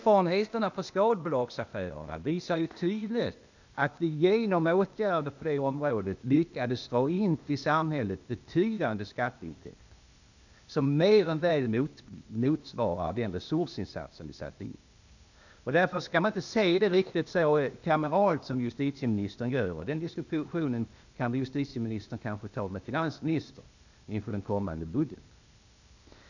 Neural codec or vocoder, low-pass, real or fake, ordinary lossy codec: codec, 16 kHz, 0.8 kbps, ZipCodec; 7.2 kHz; fake; none